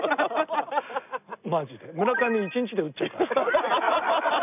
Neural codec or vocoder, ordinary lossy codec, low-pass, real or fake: none; none; 3.6 kHz; real